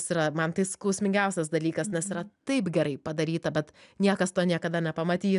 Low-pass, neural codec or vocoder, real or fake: 10.8 kHz; none; real